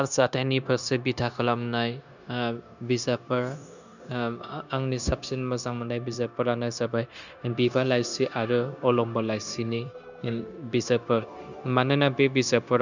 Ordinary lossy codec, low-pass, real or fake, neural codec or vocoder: none; 7.2 kHz; fake; codec, 16 kHz, 0.9 kbps, LongCat-Audio-Codec